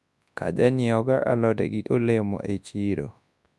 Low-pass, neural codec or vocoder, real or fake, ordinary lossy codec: none; codec, 24 kHz, 0.9 kbps, WavTokenizer, large speech release; fake; none